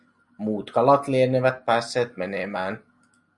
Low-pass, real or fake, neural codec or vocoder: 10.8 kHz; real; none